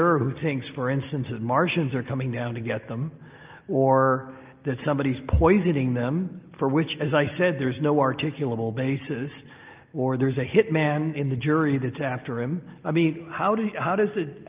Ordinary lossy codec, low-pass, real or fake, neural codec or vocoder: Opus, 32 kbps; 3.6 kHz; real; none